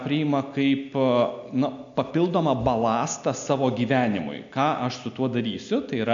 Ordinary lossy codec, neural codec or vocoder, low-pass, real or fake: MP3, 64 kbps; none; 7.2 kHz; real